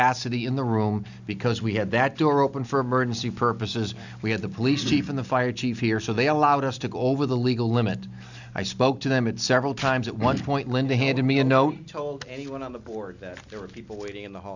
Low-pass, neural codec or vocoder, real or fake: 7.2 kHz; none; real